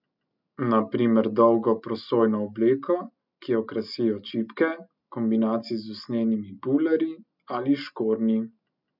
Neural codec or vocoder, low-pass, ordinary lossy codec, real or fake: none; 5.4 kHz; none; real